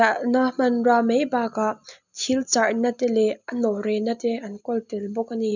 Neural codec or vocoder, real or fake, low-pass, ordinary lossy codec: none; real; 7.2 kHz; none